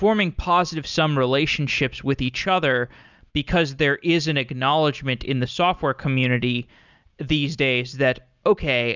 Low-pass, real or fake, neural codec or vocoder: 7.2 kHz; real; none